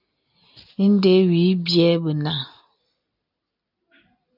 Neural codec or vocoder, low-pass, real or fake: none; 5.4 kHz; real